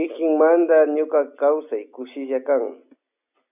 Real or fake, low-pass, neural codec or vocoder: real; 3.6 kHz; none